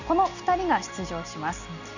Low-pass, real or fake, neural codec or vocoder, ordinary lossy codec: 7.2 kHz; real; none; none